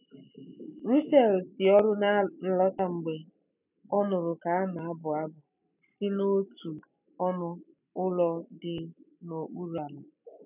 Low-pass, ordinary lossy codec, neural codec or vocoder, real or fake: 3.6 kHz; none; none; real